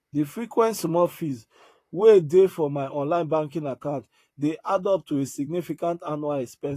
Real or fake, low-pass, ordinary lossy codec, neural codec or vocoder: fake; 14.4 kHz; AAC, 48 kbps; vocoder, 44.1 kHz, 128 mel bands, Pupu-Vocoder